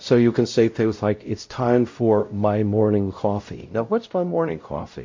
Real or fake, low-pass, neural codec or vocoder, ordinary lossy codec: fake; 7.2 kHz; codec, 16 kHz, 0.5 kbps, X-Codec, WavLM features, trained on Multilingual LibriSpeech; MP3, 48 kbps